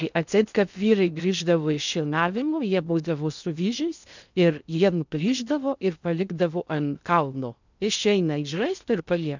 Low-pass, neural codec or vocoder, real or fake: 7.2 kHz; codec, 16 kHz in and 24 kHz out, 0.6 kbps, FocalCodec, streaming, 2048 codes; fake